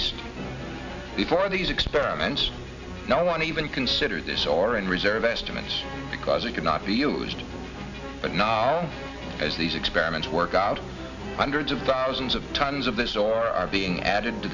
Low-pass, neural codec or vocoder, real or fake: 7.2 kHz; none; real